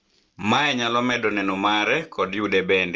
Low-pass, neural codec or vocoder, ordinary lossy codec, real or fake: 7.2 kHz; none; Opus, 24 kbps; real